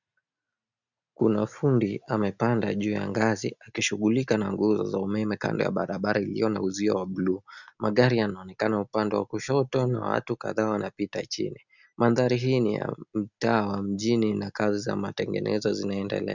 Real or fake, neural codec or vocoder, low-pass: real; none; 7.2 kHz